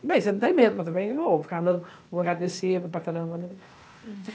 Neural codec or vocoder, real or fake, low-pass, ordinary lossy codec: codec, 16 kHz, 0.8 kbps, ZipCodec; fake; none; none